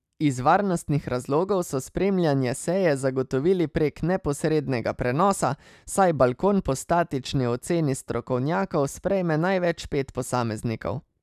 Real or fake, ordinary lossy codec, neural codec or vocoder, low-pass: real; none; none; 14.4 kHz